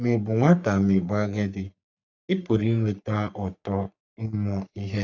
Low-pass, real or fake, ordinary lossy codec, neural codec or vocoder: 7.2 kHz; fake; none; codec, 44.1 kHz, 3.4 kbps, Pupu-Codec